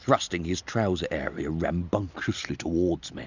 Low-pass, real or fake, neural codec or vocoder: 7.2 kHz; real; none